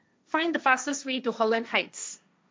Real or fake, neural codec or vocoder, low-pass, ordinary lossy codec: fake; codec, 16 kHz, 1.1 kbps, Voila-Tokenizer; none; none